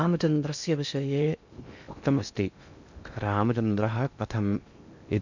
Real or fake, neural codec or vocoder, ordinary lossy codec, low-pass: fake; codec, 16 kHz in and 24 kHz out, 0.6 kbps, FocalCodec, streaming, 2048 codes; none; 7.2 kHz